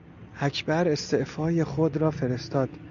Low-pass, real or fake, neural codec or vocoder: 7.2 kHz; real; none